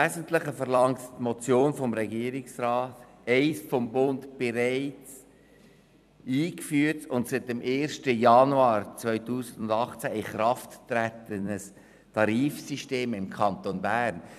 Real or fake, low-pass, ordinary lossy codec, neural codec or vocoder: fake; 14.4 kHz; none; vocoder, 44.1 kHz, 128 mel bands every 256 samples, BigVGAN v2